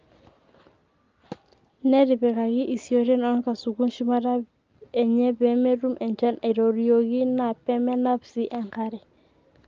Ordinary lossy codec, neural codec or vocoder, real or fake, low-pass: Opus, 24 kbps; none; real; 7.2 kHz